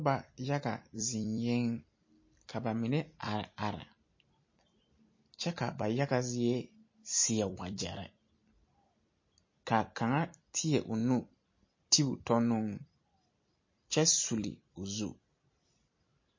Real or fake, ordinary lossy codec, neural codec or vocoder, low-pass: fake; MP3, 32 kbps; vocoder, 44.1 kHz, 128 mel bands every 256 samples, BigVGAN v2; 7.2 kHz